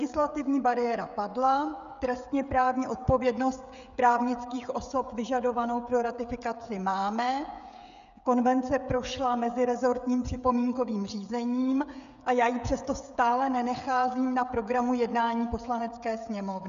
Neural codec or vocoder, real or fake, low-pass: codec, 16 kHz, 16 kbps, FreqCodec, smaller model; fake; 7.2 kHz